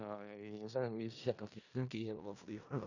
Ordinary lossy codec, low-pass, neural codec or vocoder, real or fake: none; 7.2 kHz; codec, 16 kHz in and 24 kHz out, 0.4 kbps, LongCat-Audio-Codec, four codebook decoder; fake